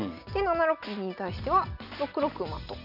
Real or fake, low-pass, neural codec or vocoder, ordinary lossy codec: real; 5.4 kHz; none; none